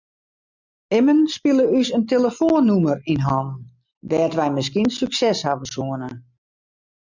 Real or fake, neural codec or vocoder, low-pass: real; none; 7.2 kHz